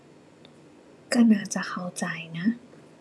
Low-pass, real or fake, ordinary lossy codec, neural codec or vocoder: none; fake; none; vocoder, 24 kHz, 100 mel bands, Vocos